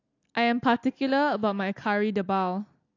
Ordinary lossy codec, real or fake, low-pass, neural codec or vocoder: AAC, 48 kbps; real; 7.2 kHz; none